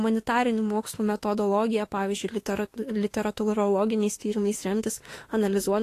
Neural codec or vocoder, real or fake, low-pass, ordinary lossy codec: autoencoder, 48 kHz, 32 numbers a frame, DAC-VAE, trained on Japanese speech; fake; 14.4 kHz; AAC, 48 kbps